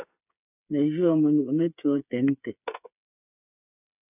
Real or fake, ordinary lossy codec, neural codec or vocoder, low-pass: fake; AAC, 32 kbps; codec, 16 kHz, 8 kbps, FreqCodec, smaller model; 3.6 kHz